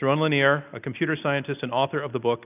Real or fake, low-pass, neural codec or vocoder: real; 3.6 kHz; none